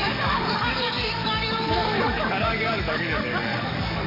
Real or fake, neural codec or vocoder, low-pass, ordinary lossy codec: fake; codec, 44.1 kHz, 7.8 kbps, DAC; 5.4 kHz; MP3, 32 kbps